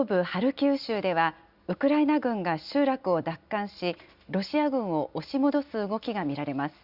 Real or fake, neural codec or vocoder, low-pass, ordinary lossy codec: real; none; 5.4 kHz; Opus, 64 kbps